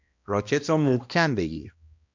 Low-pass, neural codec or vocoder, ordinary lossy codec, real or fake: 7.2 kHz; codec, 16 kHz, 1 kbps, X-Codec, HuBERT features, trained on balanced general audio; MP3, 64 kbps; fake